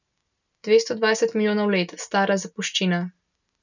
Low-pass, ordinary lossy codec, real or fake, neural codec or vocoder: 7.2 kHz; none; real; none